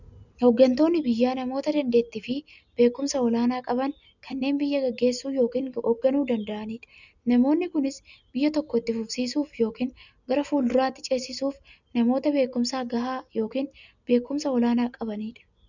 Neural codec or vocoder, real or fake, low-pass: none; real; 7.2 kHz